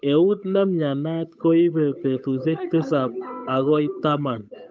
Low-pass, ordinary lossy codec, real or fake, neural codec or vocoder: none; none; fake; codec, 16 kHz, 8 kbps, FunCodec, trained on Chinese and English, 25 frames a second